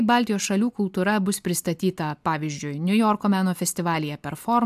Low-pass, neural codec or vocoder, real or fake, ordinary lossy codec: 14.4 kHz; none; real; AAC, 96 kbps